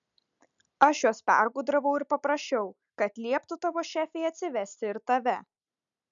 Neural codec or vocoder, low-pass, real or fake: none; 7.2 kHz; real